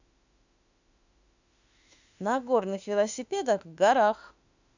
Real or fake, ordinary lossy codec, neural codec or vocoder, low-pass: fake; none; autoencoder, 48 kHz, 32 numbers a frame, DAC-VAE, trained on Japanese speech; 7.2 kHz